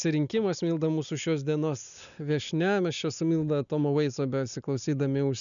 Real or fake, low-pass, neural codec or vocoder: real; 7.2 kHz; none